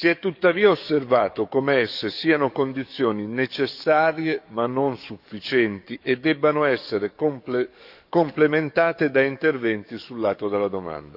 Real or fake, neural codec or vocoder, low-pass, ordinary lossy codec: fake; codec, 44.1 kHz, 7.8 kbps, DAC; 5.4 kHz; none